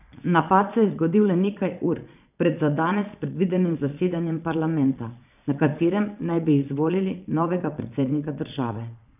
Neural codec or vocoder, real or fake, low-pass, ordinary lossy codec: vocoder, 22.05 kHz, 80 mel bands, Vocos; fake; 3.6 kHz; none